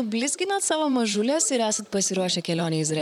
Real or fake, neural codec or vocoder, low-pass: fake; vocoder, 44.1 kHz, 128 mel bands, Pupu-Vocoder; 19.8 kHz